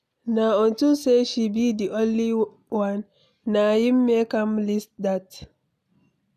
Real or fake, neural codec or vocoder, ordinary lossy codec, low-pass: real; none; none; 14.4 kHz